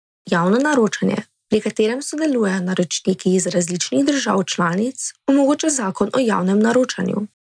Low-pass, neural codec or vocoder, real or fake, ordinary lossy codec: 9.9 kHz; none; real; none